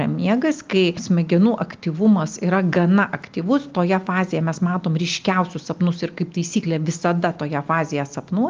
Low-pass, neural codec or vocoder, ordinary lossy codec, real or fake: 7.2 kHz; none; Opus, 32 kbps; real